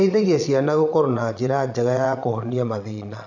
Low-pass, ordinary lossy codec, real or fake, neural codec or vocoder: 7.2 kHz; none; fake; vocoder, 22.05 kHz, 80 mel bands, Vocos